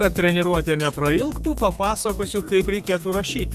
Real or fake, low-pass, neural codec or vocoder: fake; 14.4 kHz; codec, 32 kHz, 1.9 kbps, SNAC